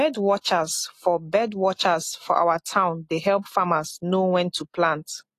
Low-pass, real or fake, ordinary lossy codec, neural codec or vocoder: 14.4 kHz; real; AAC, 48 kbps; none